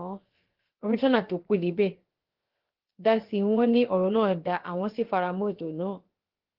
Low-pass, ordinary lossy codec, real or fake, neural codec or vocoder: 5.4 kHz; Opus, 16 kbps; fake; codec, 16 kHz, about 1 kbps, DyCAST, with the encoder's durations